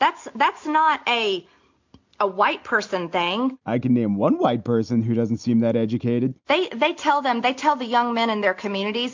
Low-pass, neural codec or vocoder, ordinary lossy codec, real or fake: 7.2 kHz; none; MP3, 64 kbps; real